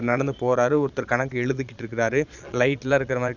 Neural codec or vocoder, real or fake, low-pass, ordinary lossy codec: vocoder, 22.05 kHz, 80 mel bands, Vocos; fake; 7.2 kHz; none